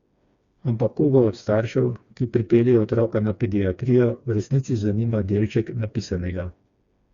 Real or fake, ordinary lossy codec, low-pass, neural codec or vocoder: fake; Opus, 64 kbps; 7.2 kHz; codec, 16 kHz, 2 kbps, FreqCodec, smaller model